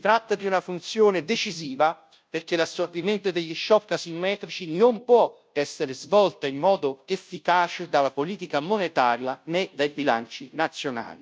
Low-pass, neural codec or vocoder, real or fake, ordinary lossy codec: none; codec, 16 kHz, 0.5 kbps, FunCodec, trained on Chinese and English, 25 frames a second; fake; none